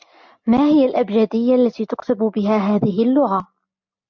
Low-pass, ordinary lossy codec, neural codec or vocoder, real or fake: 7.2 kHz; MP3, 64 kbps; none; real